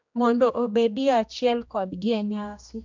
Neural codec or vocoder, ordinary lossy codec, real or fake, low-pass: codec, 16 kHz, 1 kbps, X-Codec, HuBERT features, trained on general audio; MP3, 64 kbps; fake; 7.2 kHz